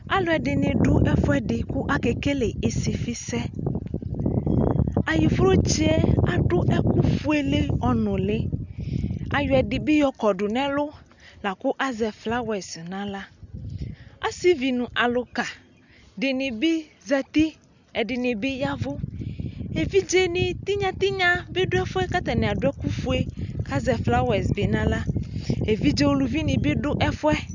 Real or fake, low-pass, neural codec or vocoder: real; 7.2 kHz; none